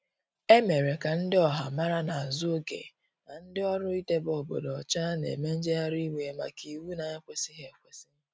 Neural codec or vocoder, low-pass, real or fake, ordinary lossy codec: none; none; real; none